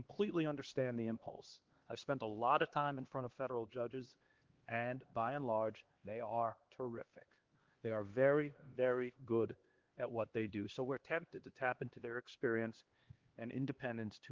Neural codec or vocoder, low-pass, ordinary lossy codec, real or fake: codec, 16 kHz, 2 kbps, X-Codec, HuBERT features, trained on LibriSpeech; 7.2 kHz; Opus, 16 kbps; fake